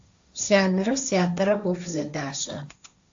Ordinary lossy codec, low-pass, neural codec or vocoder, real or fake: AAC, 64 kbps; 7.2 kHz; codec, 16 kHz, 1.1 kbps, Voila-Tokenizer; fake